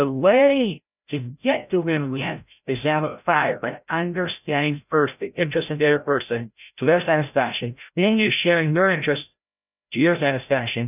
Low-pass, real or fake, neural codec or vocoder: 3.6 kHz; fake; codec, 16 kHz, 0.5 kbps, FreqCodec, larger model